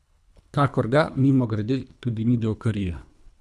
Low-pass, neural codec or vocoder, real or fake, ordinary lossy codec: none; codec, 24 kHz, 3 kbps, HILCodec; fake; none